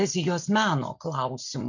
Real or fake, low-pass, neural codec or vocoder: real; 7.2 kHz; none